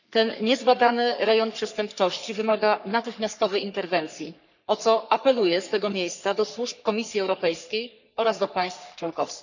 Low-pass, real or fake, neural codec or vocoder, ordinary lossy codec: 7.2 kHz; fake; codec, 44.1 kHz, 3.4 kbps, Pupu-Codec; none